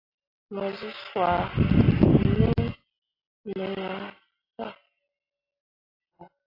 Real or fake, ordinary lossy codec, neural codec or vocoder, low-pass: real; AAC, 24 kbps; none; 5.4 kHz